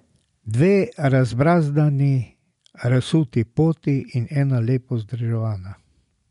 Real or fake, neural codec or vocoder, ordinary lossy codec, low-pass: real; none; MP3, 64 kbps; 10.8 kHz